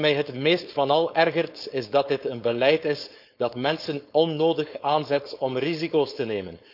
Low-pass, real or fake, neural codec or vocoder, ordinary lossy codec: 5.4 kHz; fake; codec, 16 kHz, 4.8 kbps, FACodec; none